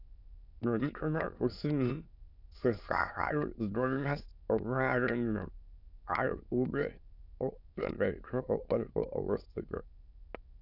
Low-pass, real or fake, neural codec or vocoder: 5.4 kHz; fake; autoencoder, 22.05 kHz, a latent of 192 numbers a frame, VITS, trained on many speakers